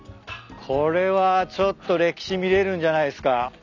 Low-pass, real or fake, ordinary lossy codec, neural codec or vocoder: 7.2 kHz; real; none; none